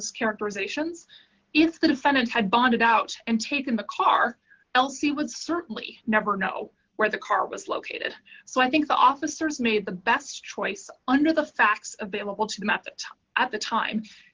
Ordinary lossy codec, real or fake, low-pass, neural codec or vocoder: Opus, 16 kbps; real; 7.2 kHz; none